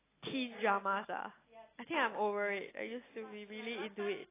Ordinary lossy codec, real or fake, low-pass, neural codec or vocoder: AAC, 16 kbps; real; 3.6 kHz; none